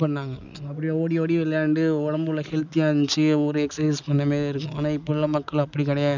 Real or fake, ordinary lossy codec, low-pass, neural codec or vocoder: real; none; 7.2 kHz; none